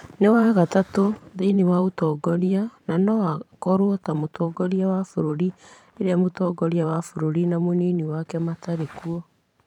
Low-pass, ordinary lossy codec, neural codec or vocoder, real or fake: 19.8 kHz; none; vocoder, 44.1 kHz, 128 mel bands every 512 samples, BigVGAN v2; fake